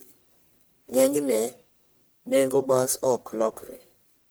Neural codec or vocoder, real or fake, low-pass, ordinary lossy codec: codec, 44.1 kHz, 1.7 kbps, Pupu-Codec; fake; none; none